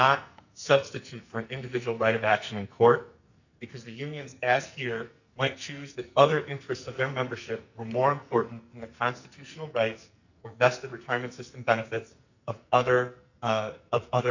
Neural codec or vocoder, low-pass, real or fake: codec, 44.1 kHz, 2.6 kbps, SNAC; 7.2 kHz; fake